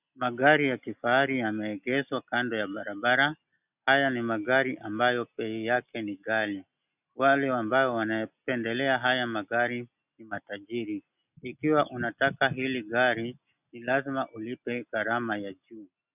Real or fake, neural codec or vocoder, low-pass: real; none; 3.6 kHz